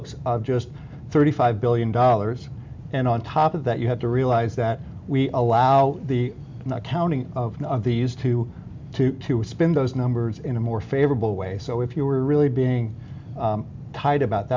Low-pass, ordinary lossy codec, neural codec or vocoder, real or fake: 7.2 kHz; MP3, 64 kbps; none; real